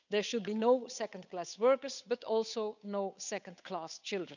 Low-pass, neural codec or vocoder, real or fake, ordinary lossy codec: 7.2 kHz; codec, 16 kHz, 8 kbps, FunCodec, trained on Chinese and English, 25 frames a second; fake; none